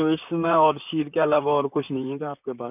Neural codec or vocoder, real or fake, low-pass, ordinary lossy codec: vocoder, 44.1 kHz, 128 mel bands, Pupu-Vocoder; fake; 3.6 kHz; none